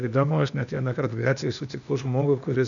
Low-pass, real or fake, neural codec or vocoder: 7.2 kHz; fake; codec, 16 kHz, 0.8 kbps, ZipCodec